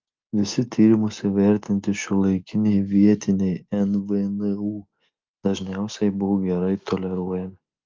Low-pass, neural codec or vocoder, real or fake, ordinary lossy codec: 7.2 kHz; none; real; Opus, 24 kbps